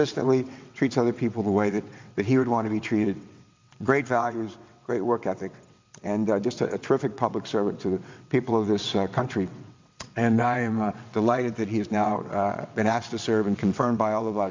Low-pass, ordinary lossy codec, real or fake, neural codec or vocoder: 7.2 kHz; MP3, 64 kbps; fake; vocoder, 22.05 kHz, 80 mel bands, WaveNeXt